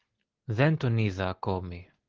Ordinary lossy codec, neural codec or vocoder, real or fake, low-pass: Opus, 16 kbps; none; real; 7.2 kHz